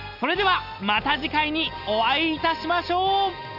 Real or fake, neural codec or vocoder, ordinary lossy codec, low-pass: real; none; Opus, 64 kbps; 5.4 kHz